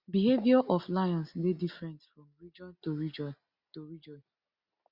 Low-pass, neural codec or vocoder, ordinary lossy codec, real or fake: 5.4 kHz; none; Opus, 64 kbps; real